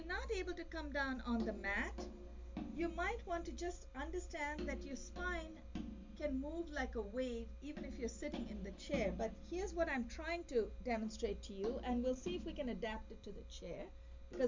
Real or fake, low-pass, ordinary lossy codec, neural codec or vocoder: real; 7.2 kHz; MP3, 64 kbps; none